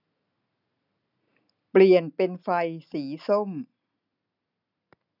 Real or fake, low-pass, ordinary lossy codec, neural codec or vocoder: real; 5.4 kHz; none; none